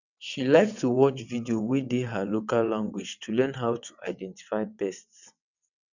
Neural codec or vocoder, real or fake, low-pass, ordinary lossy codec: vocoder, 22.05 kHz, 80 mel bands, WaveNeXt; fake; 7.2 kHz; none